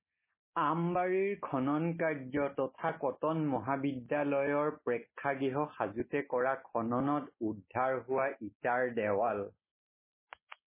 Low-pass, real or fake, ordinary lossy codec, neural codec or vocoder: 3.6 kHz; real; MP3, 16 kbps; none